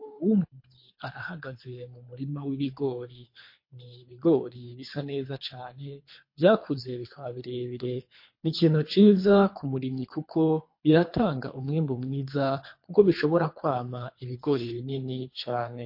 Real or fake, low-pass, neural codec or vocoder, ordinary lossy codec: fake; 5.4 kHz; codec, 24 kHz, 3 kbps, HILCodec; MP3, 32 kbps